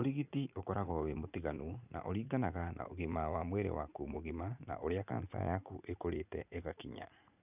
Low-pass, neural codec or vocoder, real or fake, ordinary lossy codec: 3.6 kHz; vocoder, 44.1 kHz, 80 mel bands, Vocos; fake; none